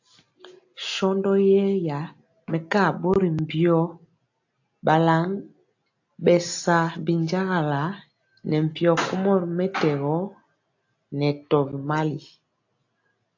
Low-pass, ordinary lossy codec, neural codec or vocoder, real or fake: 7.2 kHz; AAC, 48 kbps; none; real